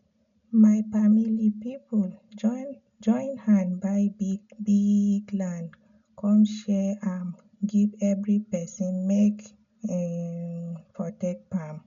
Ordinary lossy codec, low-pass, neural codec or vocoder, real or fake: none; 7.2 kHz; none; real